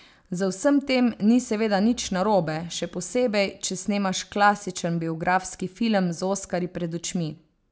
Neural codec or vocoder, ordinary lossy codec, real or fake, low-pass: none; none; real; none